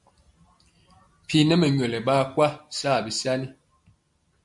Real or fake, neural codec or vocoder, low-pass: real; none; 10.8 kHz